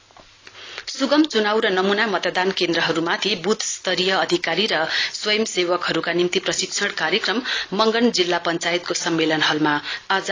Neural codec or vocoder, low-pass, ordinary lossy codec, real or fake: none; 7.2 kHz; AAC, 32 kbps; real